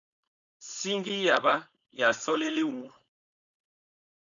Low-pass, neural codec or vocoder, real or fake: 7.2 kHz; codec, 16 kHz, 4.8 kbps, FACodec; fake